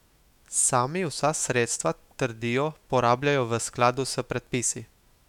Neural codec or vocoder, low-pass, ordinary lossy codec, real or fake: autoencoder, 48 kHz, 128 numbers a frame, DAC-VAE, trained on Japanese speech; 19.8 kHz; none; fake